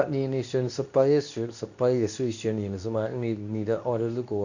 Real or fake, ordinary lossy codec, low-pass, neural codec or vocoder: fake; none; 7.2 kHz; codec, 24 kHz, 0.9 kbps, WavTokenizer, medium speech release version 2